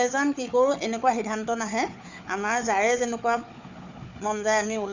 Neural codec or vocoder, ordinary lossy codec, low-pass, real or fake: codec, 16 kHz, 4 kbps, FunCodec, trained on Chinese and English, 50 frames a second; none; 7.2 kHz; fake